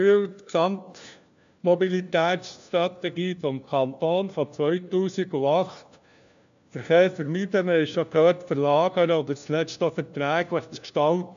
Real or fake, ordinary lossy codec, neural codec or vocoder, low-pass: fake; AAC, 96 kbps; codec, 16 kHz, 1 kbps, FunCodec, trained on LibriTTS, 50 frames a second; 7.2 kHz